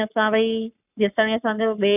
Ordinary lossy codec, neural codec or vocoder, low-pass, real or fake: none; codec, 44.1 kHz, 7.8 kbps, Pupu-Codec; 3.6 kHz; fake